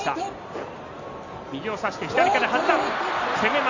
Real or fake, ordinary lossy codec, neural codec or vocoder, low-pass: real; none; none; 7.2 kHz